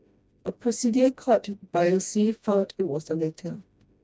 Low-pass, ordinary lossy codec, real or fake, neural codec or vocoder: none; none; fake; codec, 16 kHz, 1 kbps, FreqCodec, smaller model